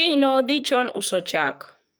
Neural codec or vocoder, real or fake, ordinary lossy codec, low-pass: codec, 44.1 kHz, 2.6 kbps, SNAC; fake; none; none